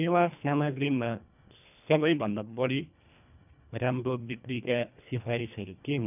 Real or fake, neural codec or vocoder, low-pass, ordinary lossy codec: fake; codec, 24 kHz, 1.5 kbps, HILCodec; 3.6 kHz; none